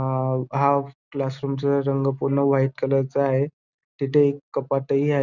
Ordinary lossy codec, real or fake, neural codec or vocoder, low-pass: none; real; none; 7.2 kHz